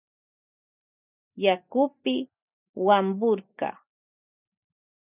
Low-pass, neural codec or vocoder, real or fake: 3.6 kHz; none; real